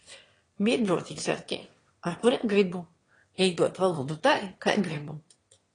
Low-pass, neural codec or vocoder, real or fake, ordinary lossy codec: 9.9 kHz; autoencoder, 22.05 kHz, a latent of 192 numbers a frame, VITS, trained on one speaker; fake; AAC, 32 kbps